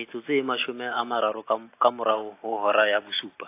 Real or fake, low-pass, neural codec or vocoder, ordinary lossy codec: real; 3.6 kHz; none; AAC, 24 kbps